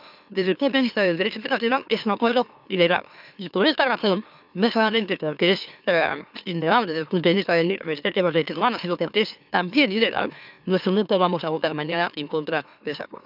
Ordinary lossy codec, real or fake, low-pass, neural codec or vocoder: none; fake; 5.4 kHz; autoencoder, 44.1 kHz, a latent of 192 numbers a frame, MeloTTS